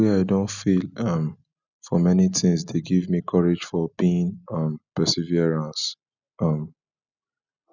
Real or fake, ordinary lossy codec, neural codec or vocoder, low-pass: real; none; none; 7.2 kHz